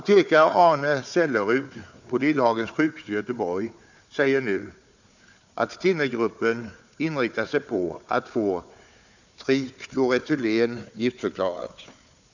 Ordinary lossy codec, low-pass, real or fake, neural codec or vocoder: none; 7.2 kHz; fake; codec, 16 kHz, 4 kbps, FunCodec, trained on Chinese and English, 50 frames a second